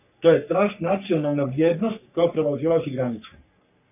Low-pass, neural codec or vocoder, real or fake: 3.6 kHz; codec, 44.1 kHz, 3.4 kbps, Pupu-Codec; fake